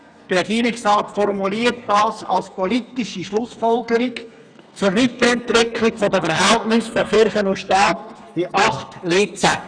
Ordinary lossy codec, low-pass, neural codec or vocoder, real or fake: none; 9.9 kHz; codec, 32 kHz, 1.9 kbps, SNAC; fake